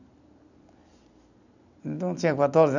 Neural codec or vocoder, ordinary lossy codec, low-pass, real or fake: none; none; 7.2 kHz; real